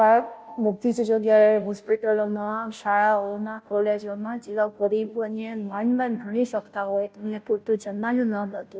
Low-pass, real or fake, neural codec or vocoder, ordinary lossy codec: none; fake; codec, 16 kHz, 0.5 kbps, FunCodec, trained on Chinese and English, 25 frames a second; none